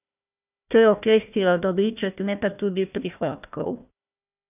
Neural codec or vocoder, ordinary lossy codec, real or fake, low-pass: codec, 16 kHz, 1 kbps, FunCodec, trained on Chinese and English, 50 frames a second; none; fake; 3.6 kHz